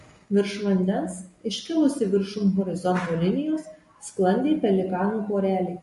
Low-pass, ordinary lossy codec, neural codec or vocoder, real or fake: 14.4 kHz; MP3, 48 kbps; none; real